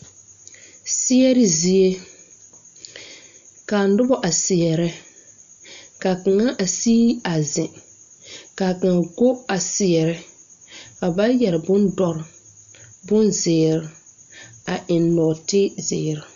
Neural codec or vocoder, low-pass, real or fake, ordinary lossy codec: none; 7.2 kHz; real; MP3, 96 kbps